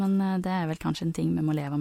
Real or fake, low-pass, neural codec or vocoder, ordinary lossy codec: real; 19.8 kHz; none; MP3, 64 kbps